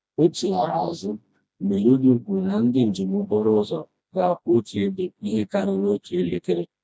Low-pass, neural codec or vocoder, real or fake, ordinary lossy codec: none; codec, 16 kHz, 1 kbps, FreqCodec, smaller model; fake; none